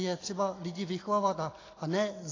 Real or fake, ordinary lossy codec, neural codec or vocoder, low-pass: real; AAC, 32 kbps; none; 7.2 kHz